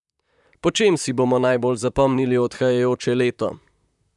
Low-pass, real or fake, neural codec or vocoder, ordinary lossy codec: 10.8 kHz; fake; vocoder, 44.1 kHz, 128 mel bands, Pupu-Vocoder; none